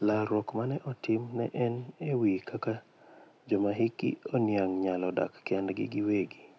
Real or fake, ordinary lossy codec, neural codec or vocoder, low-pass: real; none; none; none